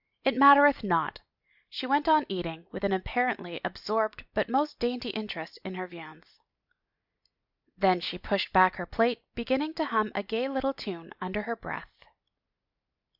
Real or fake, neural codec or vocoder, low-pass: real; none; 5.4 kHz